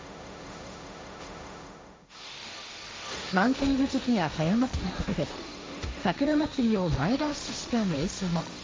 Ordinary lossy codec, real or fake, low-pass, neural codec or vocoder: none; fake; none; codec, 16 kHz, 1.1 kbps, Voila-Tokenizer